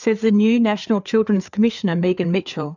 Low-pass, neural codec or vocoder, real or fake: 7.2 kHz; codec, 16 kHz, 4 kbps, FreqCodec, larger model; fake